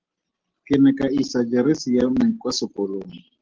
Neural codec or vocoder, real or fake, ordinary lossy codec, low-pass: none; real; Opus, 16 kbps; 7.2 kHz